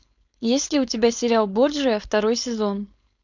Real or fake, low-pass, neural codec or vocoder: fake; 7.2 kHz; codec, 16 kHz, 4.8 kbps, FACodec